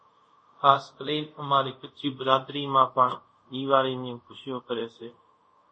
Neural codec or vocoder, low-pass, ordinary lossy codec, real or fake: codec, 24 kHz, 0.5 kbps, DualCodec; 9.9 kHz; MP3, 32 kbps; fake